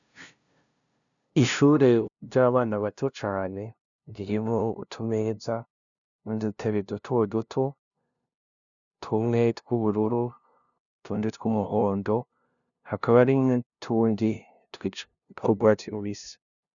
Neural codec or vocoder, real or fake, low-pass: codec, 16 kHz, 0.5 kbps, FunCodec, trained on LibriTTS, 25 frames a second; fake; 7.2 kHz